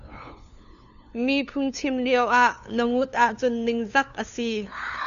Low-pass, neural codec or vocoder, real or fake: 7.2 kHz; codec, 16 kHz, 2 kbps, FunCodec, trained on LibriTTS, 25 frames a second; fake